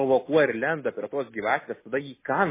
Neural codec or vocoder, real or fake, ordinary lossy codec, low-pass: none; real; MP3, 16 kbps; 3.6 kHz